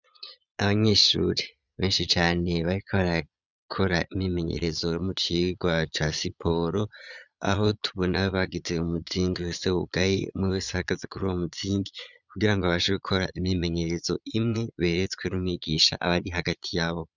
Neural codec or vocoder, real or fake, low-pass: vocoder, 22.05 kHz, 80 mel bands, Vocos; fake; 7.2 kHz